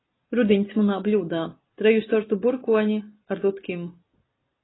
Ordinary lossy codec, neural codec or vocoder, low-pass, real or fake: AAC, 16 kbps; none; 7.2 kHz; real